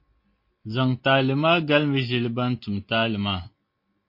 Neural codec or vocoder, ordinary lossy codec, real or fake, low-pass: none; MP3, 32 kbps; real; 5.4 kHz